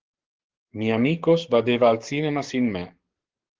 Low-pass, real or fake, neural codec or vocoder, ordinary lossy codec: 7.2 kHz; fake; codec, 44.1 kHz, 7.8 kbps, DAC; Opus, 16 kbps